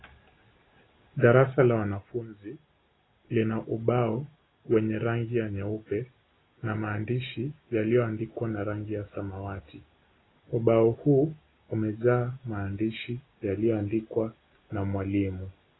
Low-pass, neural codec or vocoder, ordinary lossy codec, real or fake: 7.2 kHz; none; AAC, 16 kbps; real